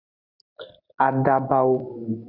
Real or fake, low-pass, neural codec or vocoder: real; 5.4 kHz; none